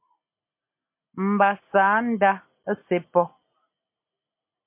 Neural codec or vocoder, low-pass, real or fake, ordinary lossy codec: none; 3.6 kHz; real; MP3, 24 kbps